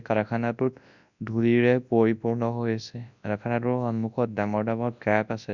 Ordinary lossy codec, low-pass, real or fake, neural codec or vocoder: none; 7.2 kHz; fake; codec, 24 kHz, 0.9 kbps, WavTokenizer, large speech release